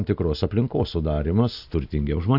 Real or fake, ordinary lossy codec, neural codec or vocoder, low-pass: real; AAC, 48 kbps; none; 5.4 kHz